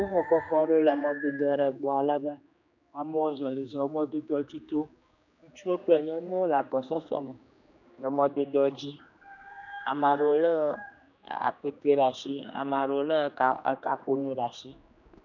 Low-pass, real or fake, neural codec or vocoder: 7.2 kHz; fake; codec, 16 kHz, 2 kbps, X-Codec, HuBERT features, trained on general audio